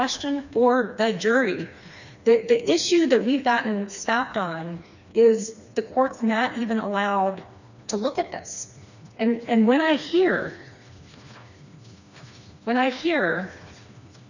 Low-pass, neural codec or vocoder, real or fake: 7.2 kHz; codec, 16 kHz, 2 kbps, FreqCodec, smaller model; fake